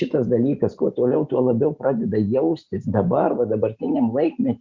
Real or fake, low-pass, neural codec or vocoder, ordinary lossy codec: real; 7.2 kHz; none; Opus, 64 kbps